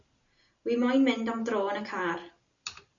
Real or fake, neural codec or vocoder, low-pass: real; none; 7.2 kHz